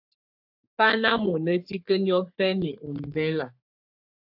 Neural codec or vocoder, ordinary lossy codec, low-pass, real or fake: codec, 44.1 kHz, 3.4 kbps, Pupu-Codec; AAC, 48 kbps; 5.4 kHz; fake